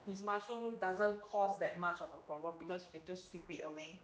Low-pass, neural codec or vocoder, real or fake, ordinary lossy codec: none; codec, 16 kHz, 1 kbps, X-Codec, HuBERT features, trained on general audio; fake; none